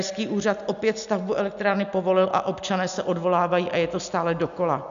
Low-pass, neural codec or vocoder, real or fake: 7.2 kHz; none; real